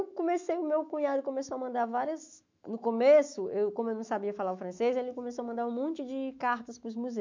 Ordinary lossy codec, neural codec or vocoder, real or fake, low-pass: MP3, 64 kbps; autoencoder, 48 kHz, 128 numbers a frame, DAC-VAE, trained on Japanese speech; fake; 7.2 kHz